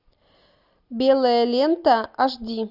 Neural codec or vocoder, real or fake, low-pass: none; real; 5.4 kHz